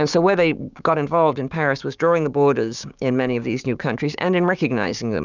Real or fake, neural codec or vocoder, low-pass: fake; codec, 16 kHz, 6 kbps, DAC; 7.2 kHz